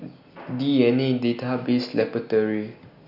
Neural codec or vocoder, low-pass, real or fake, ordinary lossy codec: none; 5.4 kHz; real; none